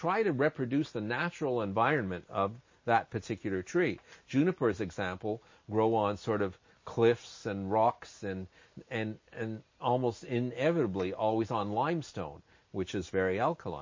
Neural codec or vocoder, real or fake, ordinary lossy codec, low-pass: none; real; MP3, 32 kbps; 7.2 kHz